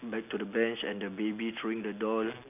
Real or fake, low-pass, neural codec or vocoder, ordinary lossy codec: real; 3.6 kHz; none; none